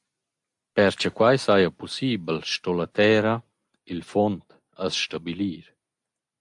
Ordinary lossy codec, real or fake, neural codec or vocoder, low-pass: AAC, 64 kbps; real; none; 10.8 kHz